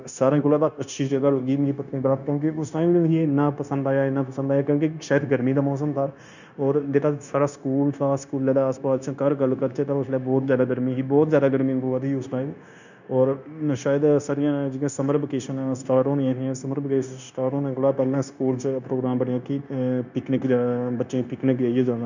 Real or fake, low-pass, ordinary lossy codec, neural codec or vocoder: fake; 7.2 kHz; none; codec, 16 kHz, 0.9 kbps, LongCat-Audio-Codec